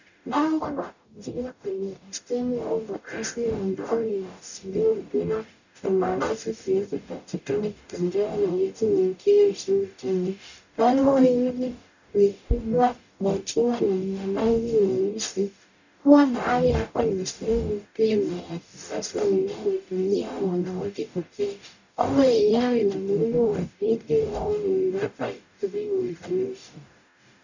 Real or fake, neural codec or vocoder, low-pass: fake; codec, 44.1 kHz, 0.9 kbps, DAC; 7.2 kHz